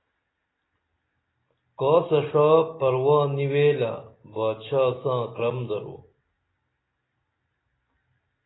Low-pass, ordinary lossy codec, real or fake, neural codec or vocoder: 7.2 kHz; AAC, 16 kbps; real; none